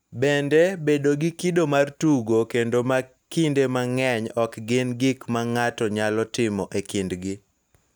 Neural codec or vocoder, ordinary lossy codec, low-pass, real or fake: none; none; none; real